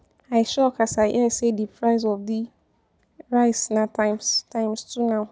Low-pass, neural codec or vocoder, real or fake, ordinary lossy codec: none; none; real; none